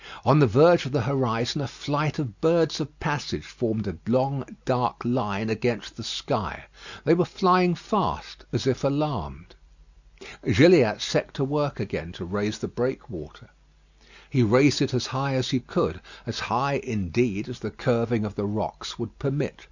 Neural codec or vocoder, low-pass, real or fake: none; 7.2 kHz; real